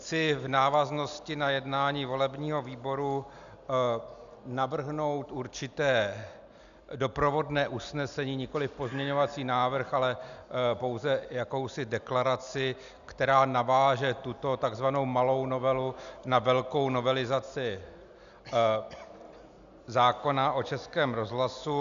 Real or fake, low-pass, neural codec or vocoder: real; 7.2 kHz; none